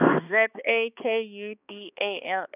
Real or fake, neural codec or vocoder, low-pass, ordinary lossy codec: fake; codec, 16 kHz, 2 kbps, X-Codec, HuBERT features, trained on balanced general audio; 3.6 kHz; none